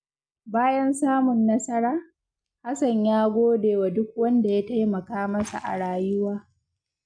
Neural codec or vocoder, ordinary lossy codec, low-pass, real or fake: none; none; 9.9 kHz; real